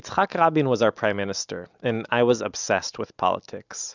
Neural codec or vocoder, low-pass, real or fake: none; 7.2 kHz; real